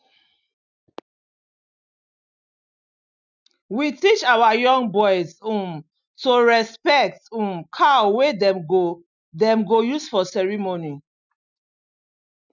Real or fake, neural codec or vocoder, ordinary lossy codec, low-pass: real; none; none; 7.2 kHz